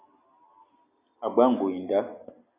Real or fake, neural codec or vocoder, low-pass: real; none; 3.6 kHz